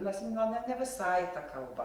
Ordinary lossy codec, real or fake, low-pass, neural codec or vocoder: Opus, 32 kbps; fake; 19.8 kHz; vocoder, 44.1 kHz, 128 mel bands every 512 samples, BigVGAN v2